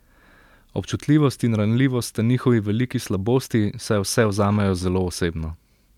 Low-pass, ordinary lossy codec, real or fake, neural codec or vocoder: 19.8 kHz; none; real; none